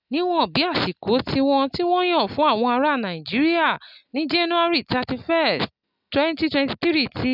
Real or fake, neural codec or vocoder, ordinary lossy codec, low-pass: real; none; none; 5.4 kHz